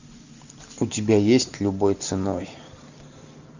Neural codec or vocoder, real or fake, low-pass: none; real; 7.2 kHz